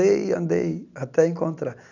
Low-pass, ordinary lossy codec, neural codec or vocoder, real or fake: 7.2 kHz; none; none; real